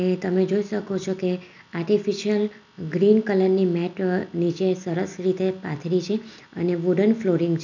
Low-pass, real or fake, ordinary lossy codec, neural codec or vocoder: 7.2 kHz; real; none; none